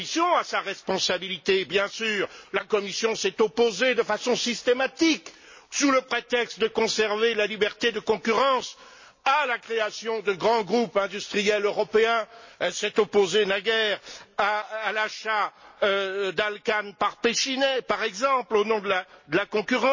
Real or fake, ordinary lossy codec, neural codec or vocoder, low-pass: real; MP3, 32 kbps; none; 7.2 kHz